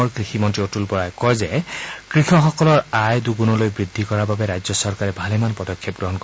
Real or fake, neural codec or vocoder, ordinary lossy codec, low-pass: real; none; none; none